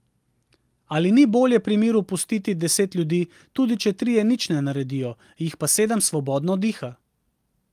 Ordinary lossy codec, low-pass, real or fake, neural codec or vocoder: Opus, 32 kbps; 14.4 kHz; real; none